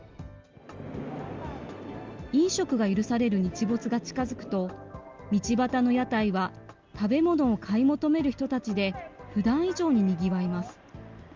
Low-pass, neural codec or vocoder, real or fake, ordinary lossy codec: 7.2 kHz; none; real; Opus, 32 kbps